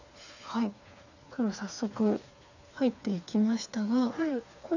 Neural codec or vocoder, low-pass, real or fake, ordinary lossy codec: codec, 16 kHz, 4 kbps, FreqCodec, smaller model; 7.2 kHz; fake; none